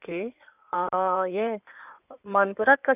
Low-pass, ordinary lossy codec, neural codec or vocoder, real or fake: 3.6 kHz; none; codec, 16 kHz in and 24 kHz out, 2.2 kbps, FireRedTTS-2 codec; fake